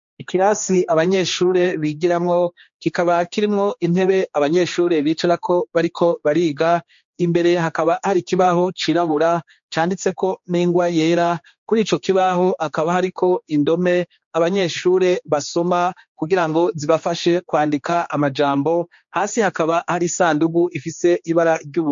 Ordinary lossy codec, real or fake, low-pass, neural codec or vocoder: MP3, 48 kbps; fake; 7.2 kHz; codec, 16 kHz, 2 kbps, X-Codec, HuBERT features, trained on general audio